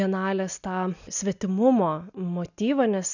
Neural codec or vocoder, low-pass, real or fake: none; 7.2 kHz; real